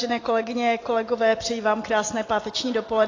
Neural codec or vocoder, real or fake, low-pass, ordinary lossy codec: none; real; 7.2 kHz; AAC, 32 kbps